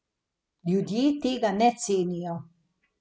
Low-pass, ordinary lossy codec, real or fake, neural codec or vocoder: none; none; real; none